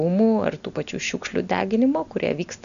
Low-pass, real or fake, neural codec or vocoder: 7.2 kHz; real; none